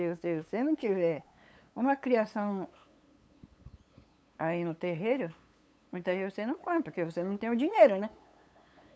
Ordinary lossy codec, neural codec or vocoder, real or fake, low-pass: none; codec, 16 kHz, 8 kbps, FunCodec, trained on LibriTTS, 25 frames a second; fake; none